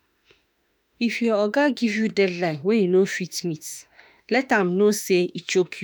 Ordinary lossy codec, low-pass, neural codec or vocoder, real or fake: none; none; autoencoder, 48 kHz, 32 numbers a frame, DAC-VAE, trained on Japanese speech; fake